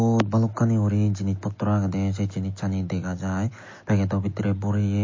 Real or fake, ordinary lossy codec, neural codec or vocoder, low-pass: real; MP3, 32 kbps; none; 7.2 kHz